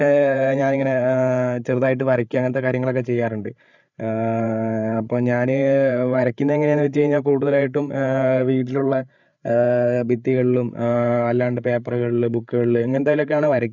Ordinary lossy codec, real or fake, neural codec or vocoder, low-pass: none; fake; codec, 16 kHz, 8 kbps, FreqCodec, larger model; 7.2 kHz